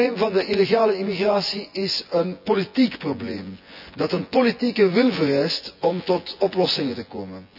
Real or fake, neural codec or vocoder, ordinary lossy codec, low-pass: fake; vocoder, 24 kHz, 100 mel bands, Vocos; none; 5.4 kHz